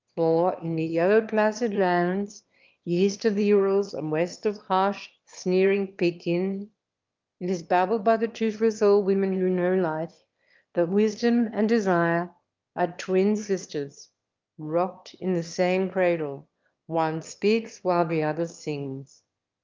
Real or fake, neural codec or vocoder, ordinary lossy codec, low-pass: fake; autoencoder, 22.05 kHz, a latent of 192 numbers a frame, VITS, trained on one speaker; Opus, 32 kbps; 7.2 kHz